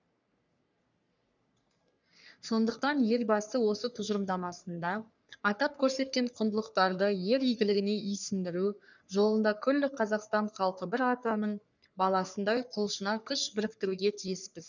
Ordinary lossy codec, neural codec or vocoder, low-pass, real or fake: none; codec, 44.1 kHz, 3.4 kbps, Pupu-Codec; 7.2 kHz; fake